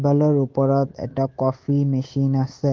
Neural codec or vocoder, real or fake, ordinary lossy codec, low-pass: codec, 24 kHz, 3.1 kbps, DualCodec; fake; Opus, 16 kbps; 7.2 kHz